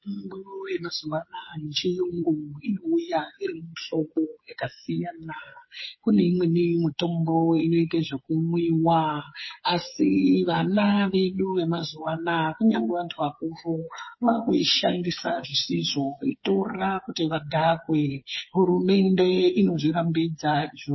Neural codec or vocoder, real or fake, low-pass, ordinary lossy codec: codec, 16 kHz, 8 kbps, FreqCodec, smaller model; fake; 7.2 kHz; MP3, 24 kbps